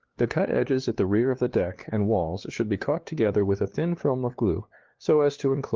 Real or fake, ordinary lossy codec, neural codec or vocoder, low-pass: fake; Opus, 16 kbps; codec, 16 kHz, 2 kbps, FunCodec, trained on LibriTTS, 25 frames a second; 7.2 kHz